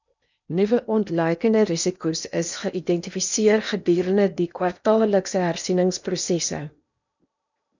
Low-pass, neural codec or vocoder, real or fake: 7.2 kHz; codec, 16 kHz in and 24 kHz out, 0.8 kbps, FocalCodec, streaming, 65536 codes; fake